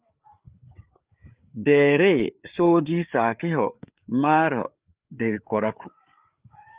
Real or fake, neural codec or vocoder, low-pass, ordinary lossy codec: fake; codec, 16 kHz, 4 kbps, FreqCodec, larger model; 3.6 kHz; Opus, 32 kbps